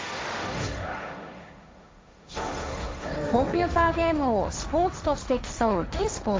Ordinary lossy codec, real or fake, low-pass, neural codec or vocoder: none; fake; none; codec, 16 kHz, 1.1 kbps, Voila-Tokenizer